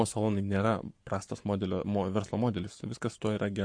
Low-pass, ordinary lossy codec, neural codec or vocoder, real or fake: 9.9 kHz; MP3, 48 kbps; codec, 44.1 kHz, 7.8 kbps, Pupu-Codec; fake